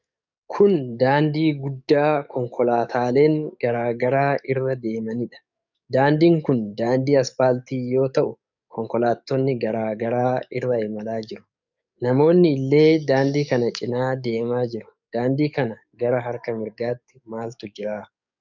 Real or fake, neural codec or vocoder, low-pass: fake; codec, 44.1 kHz, 7.8 kbps, DAC; 7.2 kHz